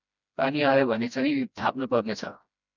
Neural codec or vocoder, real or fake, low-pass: codec, 16 kHz, 1 kbps, FreqCodec, smaller model; fake; 7.2 kHz